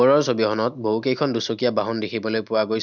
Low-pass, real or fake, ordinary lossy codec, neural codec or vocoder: 7.2 kHz; real; none; none